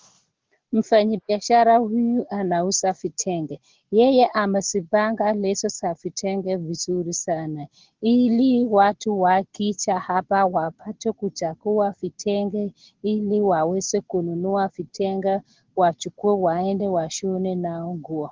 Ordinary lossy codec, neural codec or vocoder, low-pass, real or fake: Opus, 16 kbps; none; 7.2 kHz; real